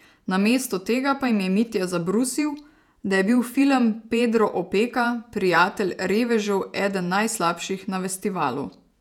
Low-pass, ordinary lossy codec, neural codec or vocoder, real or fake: 19.8 kHz; none; vocoder, 48 kHz, 128 mel bands, Vocos; fake